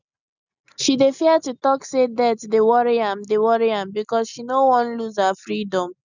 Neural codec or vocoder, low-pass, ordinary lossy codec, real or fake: none; 7.2 kHz; none; real